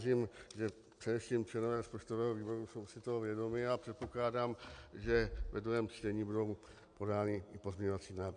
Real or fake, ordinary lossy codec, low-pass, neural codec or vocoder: real; MP3, 64 kbps; 9.9 kHz; none